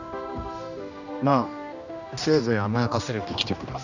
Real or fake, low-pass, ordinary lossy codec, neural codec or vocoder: fake; 7.2 kHz; none; codec, 16 kHz, 1 kbps, X-Codec, HuBERT features, trained on general audio